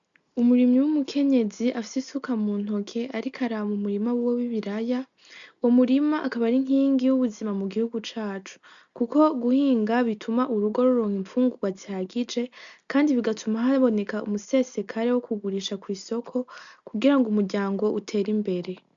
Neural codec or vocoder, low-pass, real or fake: none; 7.2 kHz; real